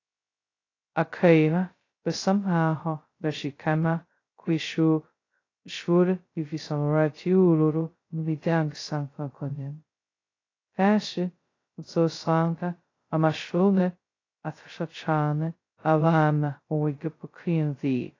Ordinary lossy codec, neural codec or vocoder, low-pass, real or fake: AAC, 32 kbps; codec, 16 kHz, 0.2 kbps, FocalCodec; 7.2 kHz; fake